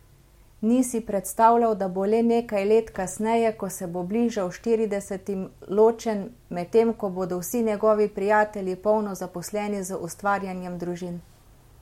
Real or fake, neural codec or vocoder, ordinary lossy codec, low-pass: real; none; MP3, 64 kbps; 19.8 kHz